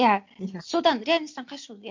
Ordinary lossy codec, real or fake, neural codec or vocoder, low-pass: MP3, 48 kbps; real; none; 7.2 kHz